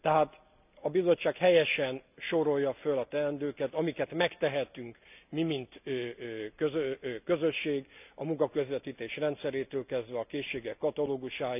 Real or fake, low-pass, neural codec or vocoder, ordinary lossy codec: real; 3.6 kHz; none; none